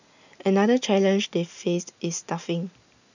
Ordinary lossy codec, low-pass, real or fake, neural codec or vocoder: none; 7.2 kHz; real; none